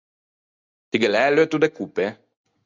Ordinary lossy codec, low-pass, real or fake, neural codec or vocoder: Opus, 64 kbps; 7.2 kHz; real; none